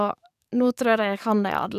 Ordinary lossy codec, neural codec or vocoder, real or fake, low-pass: none; codec, 44.1 kHz, 7.8 kbps, DAC; fake; 14.4 kHz